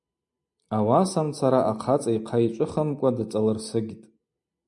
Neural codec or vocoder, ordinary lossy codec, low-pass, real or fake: none; MP3, 64 kbps; 10.8 kHz; real